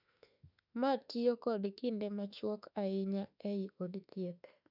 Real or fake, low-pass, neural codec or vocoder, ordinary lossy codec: fake; 5.4 kHz; autoencoder, 48 kHz, 32 numbers a frame, DAC-VAE, trained on Japanese speech; none